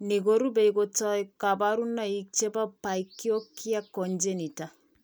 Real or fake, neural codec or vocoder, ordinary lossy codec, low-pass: real; none; none; none